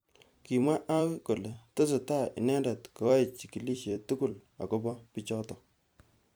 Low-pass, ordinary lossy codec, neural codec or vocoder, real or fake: none; none; vocoder, 44.1 kHz, 128 mel bands every 256 samples, BigVGAN v2; fake